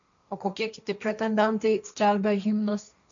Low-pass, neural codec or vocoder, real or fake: 7.2 kHz; codec, 16 kHz, 1.1 kbps, Voila-Tokenizer; fake